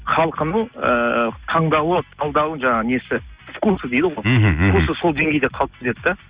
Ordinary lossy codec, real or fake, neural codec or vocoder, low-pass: Opus, 64 kbps; real; none; 3.6 kHz